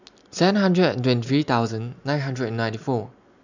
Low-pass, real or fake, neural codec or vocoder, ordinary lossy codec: 7.2 kHz; real; none; none